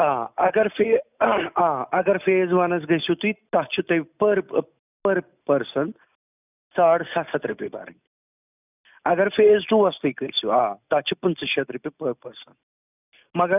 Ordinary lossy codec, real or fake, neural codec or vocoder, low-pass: none; real; none; 3.6 kHz